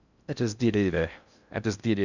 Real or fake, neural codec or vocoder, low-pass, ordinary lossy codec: fake; codec, 16 kHz in and 24 kHz out, 0.6 kbps, FocalCodec, streaming, 4096 codes; 7.2 kHz; none